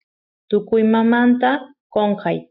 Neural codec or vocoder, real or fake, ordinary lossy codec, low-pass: none; real; AAC, 48 kbps; 5.4 kHz